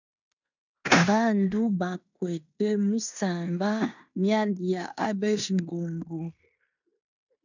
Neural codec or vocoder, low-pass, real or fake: codec, 16 kHz in and 24 kHz out, 0.9 kbps, LongCat-Audio-Codec, fine tuned four codebook decoder; 7.2 kHz; fake